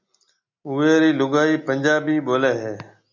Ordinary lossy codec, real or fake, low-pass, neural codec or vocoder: MP3, 64 kbps; real; 7.2 kHz; none